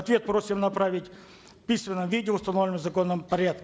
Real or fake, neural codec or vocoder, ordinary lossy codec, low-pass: real; none; none; none